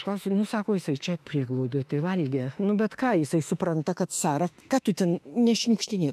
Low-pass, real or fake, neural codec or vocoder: 14.4 kHz; fake; autoencoder, 48 kHz, 32 numbers a frame, DAC-VAE, trained on Japanese speech